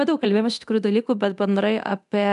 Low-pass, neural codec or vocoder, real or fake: 10.8 kHz; codec, 24 kHz, 0.9 kbps, DualCodec; fake